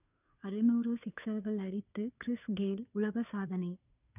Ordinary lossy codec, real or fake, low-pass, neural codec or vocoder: none; fake; 3.6 kHz; codec, 16 kHz, 2 kbps, FunCodec, trained on Chinese and English, 25 frames a second